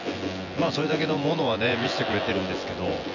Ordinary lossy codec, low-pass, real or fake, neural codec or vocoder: none; 7.2 kHz; fake; vocoder, 24 kHz, 100 mel bands, Vocos